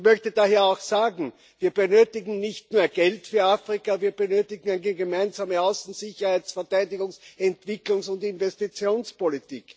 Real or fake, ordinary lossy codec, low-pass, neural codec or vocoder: real; none; none; none